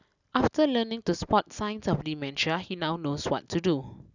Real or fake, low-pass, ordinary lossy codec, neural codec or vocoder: real; 7.2 kHz; none; none